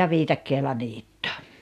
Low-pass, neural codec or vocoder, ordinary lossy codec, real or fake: 14.4 kHz; none; none; real